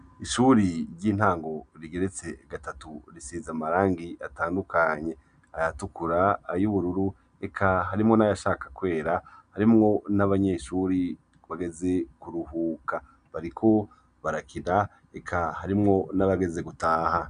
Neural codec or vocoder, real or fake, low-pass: none; real; 9.9 kHz